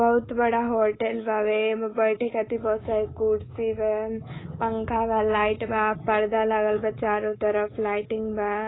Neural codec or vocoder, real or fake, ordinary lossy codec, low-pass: codec, 24 kHz, 3.1 kbps, DualCodec; fake; AAC, 16 kbps; 7.2 kHz